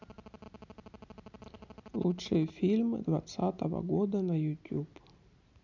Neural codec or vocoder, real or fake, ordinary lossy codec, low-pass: none; real; none; 7.2 kHz